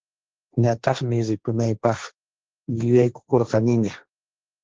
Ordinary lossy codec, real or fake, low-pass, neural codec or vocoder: Opus, 16 kbps; fake; 7.2 kHz; codec, 16 kHz, 1.1 kbps, Voila-Tokenizer